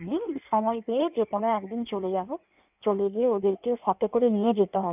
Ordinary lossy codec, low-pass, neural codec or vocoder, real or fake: none; 3.6 kHz; codec, 16 kHz in and 24 kHz out, 1.1 kbps, FireRedTTS-2 codec; fake